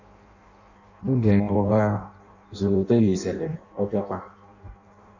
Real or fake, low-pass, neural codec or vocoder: fake; 7.2 kHz; codec, 16 kHz in and 24 kHz out, 0.6 kbps, FireRedTTS-2 codec